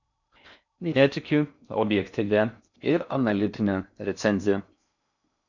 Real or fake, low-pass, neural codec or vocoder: fake; 7.2 kHz; codec, 16 kHz in and 24 kHz out, 0.6 kbps, FocalCodec, streaming, 2048 codes